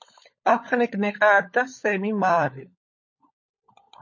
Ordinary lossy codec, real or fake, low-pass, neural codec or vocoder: MP3, 32 kbps; fake; 7.2 kHz; codec, 16 kHz, 16 kbps, FunCodec, trained on LibriTTS, 50 frames a second